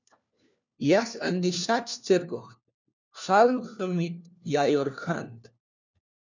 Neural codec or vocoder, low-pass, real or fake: codec, 16 kHz, 1 kbps, FunCodec, trained on LibriTTS, 50 frames a second; 7.2 kHz; fake